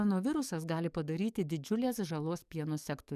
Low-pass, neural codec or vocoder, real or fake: 14.4 kHz; codec, 44.1 kHz, 7.8 kbps, DAC; fake